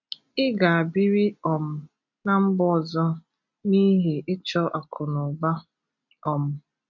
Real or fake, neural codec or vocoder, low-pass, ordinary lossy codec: real; none; 7.2 kHz; none